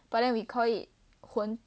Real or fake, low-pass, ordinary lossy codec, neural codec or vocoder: real; none; none; none